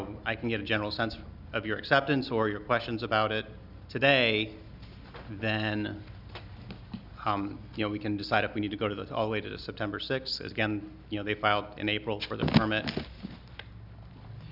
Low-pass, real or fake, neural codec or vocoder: 5.4 kHz; real; none